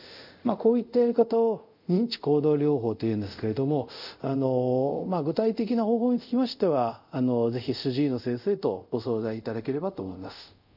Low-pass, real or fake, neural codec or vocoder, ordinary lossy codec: 5.4 kHz; fake; codec, 24 kHz, 0.5 kbps, DualCodec; none